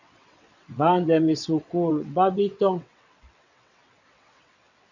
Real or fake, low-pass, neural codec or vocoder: fake; 7.2 kHz; vocoder, 22.05 kHz, 80 mel bands, WaveNeXt